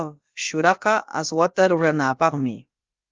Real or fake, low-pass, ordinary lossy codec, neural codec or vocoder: fake; 7.2 kHz; Opus, 32 kbps; codec, 16 kHz, about 1 kbps, DyCAST, with the encoder's durations